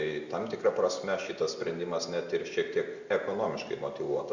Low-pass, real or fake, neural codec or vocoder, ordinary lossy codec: 7.2 kHz; real; none; Opus, 64 kbps